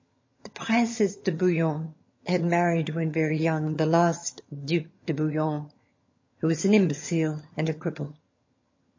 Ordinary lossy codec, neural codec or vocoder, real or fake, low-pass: MP3, 32 kbps; vocoder, 22.05 kHz, 80 mel bands, HiFi-GAN; fake; 7.2 kHz